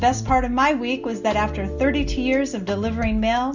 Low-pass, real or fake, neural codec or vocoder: 7.2 kHz; real; none